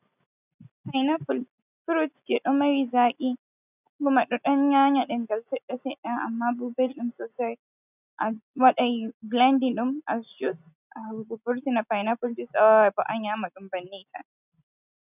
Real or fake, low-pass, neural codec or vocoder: real; 3.6 kHz; none